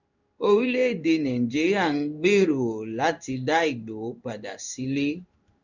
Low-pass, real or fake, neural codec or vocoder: 7.2 kHz; fake; codec, 16 kHz in and 24 kHz out, 1 kbps, XY-Tokenizer